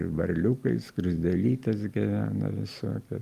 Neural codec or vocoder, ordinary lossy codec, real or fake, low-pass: none; Opus, 32 kbps; real; 14.4 kHz